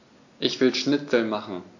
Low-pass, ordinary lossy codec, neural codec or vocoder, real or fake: 7.2 kHz; AAC, 48 kbps; none; real